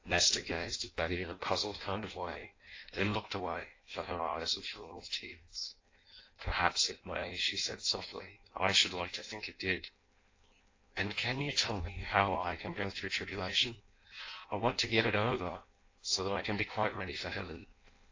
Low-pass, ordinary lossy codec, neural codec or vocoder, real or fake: 7.2 kHz; AAC, 32 kbps; codec, 16 kHz in and 24 kHz out, 0.6 kbps, FireRedTTS-2 codec; fake